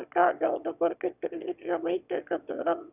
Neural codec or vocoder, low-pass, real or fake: autoencoder, 22.05 kHz, a latent of 192 numbers a frame, VITS, trained on one speaker; 3.6 kHz; fake